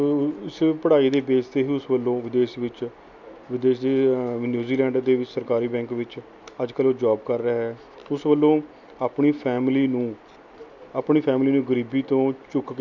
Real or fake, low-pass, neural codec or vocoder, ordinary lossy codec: real; 7.2 kHz; none; none